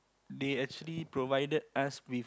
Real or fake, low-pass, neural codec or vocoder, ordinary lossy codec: real; none; none; none